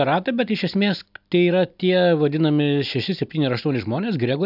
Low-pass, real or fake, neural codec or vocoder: 5.4 kHz; real; none